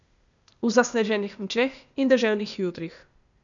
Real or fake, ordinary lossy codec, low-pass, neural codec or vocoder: fake; none; 7.2 kHz; codec, 16 kHz, 0.8 kbps, ZipCodec